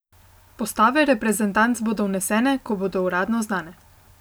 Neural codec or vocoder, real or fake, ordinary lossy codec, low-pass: none; real; none; none